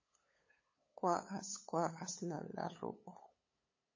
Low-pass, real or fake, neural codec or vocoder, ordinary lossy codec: 7.2 kHz; fake; codec, 16 kHz, 8 kbps, FunCodec, trained on LibriTTS, 25 frames a second; MP3, 32 kbps